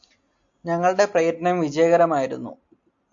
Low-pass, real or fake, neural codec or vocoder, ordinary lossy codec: 7.2 kHz; real; none; AAC, 64 kbps